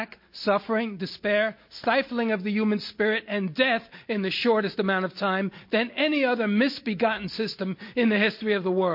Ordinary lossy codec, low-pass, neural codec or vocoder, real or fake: MP3, 32 kbps; 5.4 kHz; none; real